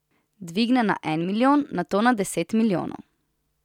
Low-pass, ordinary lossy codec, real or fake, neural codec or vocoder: 19.8 kHz; none; real; none